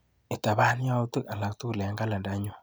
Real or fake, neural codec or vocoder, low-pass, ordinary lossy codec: fake; vocoder, 44.1 kHz, 128 mel bands every 256 samples, BigVGAN v2; none; none